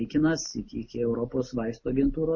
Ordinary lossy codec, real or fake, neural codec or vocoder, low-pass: MP3, 32 kbps; real; none; 7.2 kHz